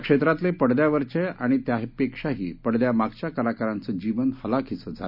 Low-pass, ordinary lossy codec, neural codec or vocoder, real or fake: 5.4 kHz; none; none; real